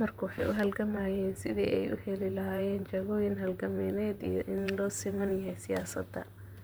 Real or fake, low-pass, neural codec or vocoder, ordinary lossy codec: fake; none; vocoder, 44.1 kHz, 128 mel bands, Pupu-Vocoder; none